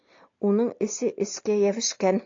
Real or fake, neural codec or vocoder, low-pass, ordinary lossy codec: real; none; 7.2 kHz; AAC, 48 kbps